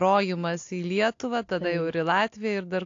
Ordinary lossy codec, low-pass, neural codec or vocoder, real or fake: AAC, 48 kbps; 7.2 kHz; none; real